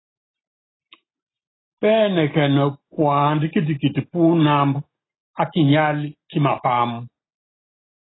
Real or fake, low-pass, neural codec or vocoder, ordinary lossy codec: real; 7.2 kHz; none; AAC, 16 kbps